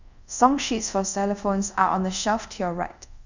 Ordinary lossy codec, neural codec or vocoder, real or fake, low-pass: none; codec, 24 kHz, 0.5 kbps, DualCodec; fake; 7.2 kHz